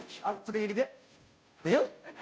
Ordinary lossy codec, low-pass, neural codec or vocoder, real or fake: none; none; codec, 16 kHz, 0.5 kbps, FunCodec, trained on Chinese and English, 25 frames a second; fake